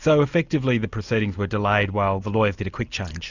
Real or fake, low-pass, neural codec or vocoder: real; 7.2 kHz; none